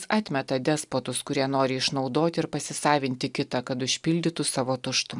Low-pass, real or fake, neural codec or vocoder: 10.8 kHz; real; none